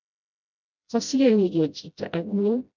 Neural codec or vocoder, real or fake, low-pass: codec, 16 kHz, 0.5 kbps, FreqCodec, smaller model; fake; 7.2 kHz